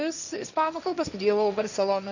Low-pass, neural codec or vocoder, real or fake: 7.2 kHz; codec, 16 kHz, 1.1 kbps, Voila-Tokenizer; fake